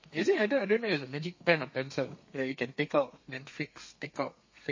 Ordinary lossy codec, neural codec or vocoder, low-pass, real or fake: MP3, 32 kbps; codec, 32 kHz, 1.9 kbps, SNAC; 7.2 kHz; fake